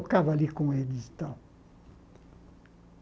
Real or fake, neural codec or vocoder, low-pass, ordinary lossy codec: real; none; none; none